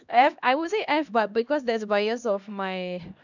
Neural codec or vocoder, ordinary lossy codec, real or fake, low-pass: codec, 16 kHz, 1 kbps, X-Codec, HuBERT features, trained on LibriSpeech; none; fake; 7.2 kHz